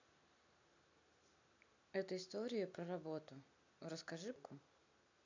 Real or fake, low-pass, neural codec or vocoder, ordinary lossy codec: real; 7.2 kHz; none; AAC, 48 kbps